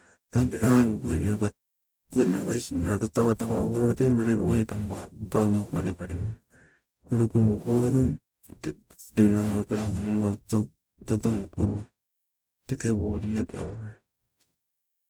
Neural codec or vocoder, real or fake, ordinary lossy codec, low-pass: codec, 44.1 kHz, 0.9 kbps, DAC; fake; none; none